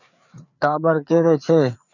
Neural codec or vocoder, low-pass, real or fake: codec, 16 kHz, 4 kbps, FreqCodec, larger model; 7.2 kHz; fake